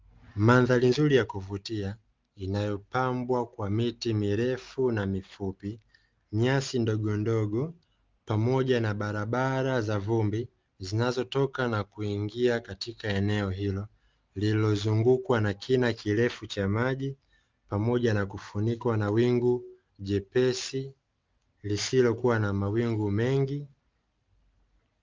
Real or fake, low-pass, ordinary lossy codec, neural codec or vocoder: real; 7.2 kHz; Opus, 32 kbps; none